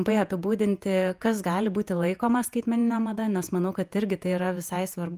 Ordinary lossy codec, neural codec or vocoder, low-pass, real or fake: Opus, 32 kbps; vocoder, 48 kHz, 128 mel bands, Vocos; 14.4 kHz; fake